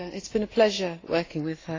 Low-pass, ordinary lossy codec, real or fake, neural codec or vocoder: 7.2 kHz; AAC, 32 kbps; real; none